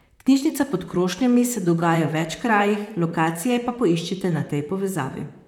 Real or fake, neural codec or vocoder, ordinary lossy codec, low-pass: fake; vocoder, 44.1 kHz, 128 mel bands, Pupu-Vocoder; none; 19.8 kHz